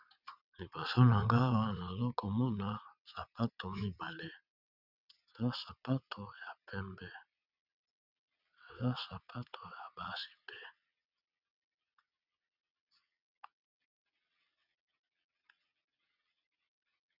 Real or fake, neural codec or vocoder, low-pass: fake; vocoder, 22.05 kHz, 80 mel bands, WaveNeXt; 5.4 kHz